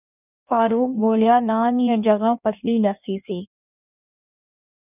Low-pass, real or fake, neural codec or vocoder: 3.6 kHz; fake; codec, 16 kHz in and 24 kHz out, 1.1 kbps, FireRedTTS-2 codec